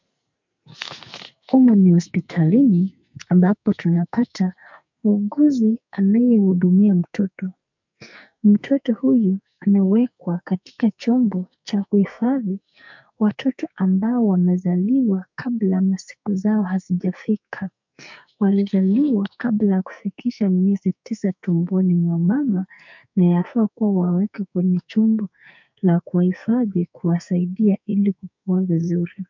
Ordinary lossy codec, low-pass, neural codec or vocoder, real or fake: MP3, 64 kbps; 7.2 kHz; codec, 44.1 kHz, 2.6 kbps, SNAC; fake